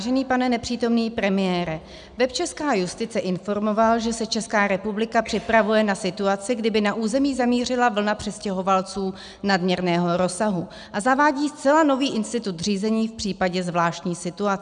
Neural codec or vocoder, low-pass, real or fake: none; 9.9 kHz; real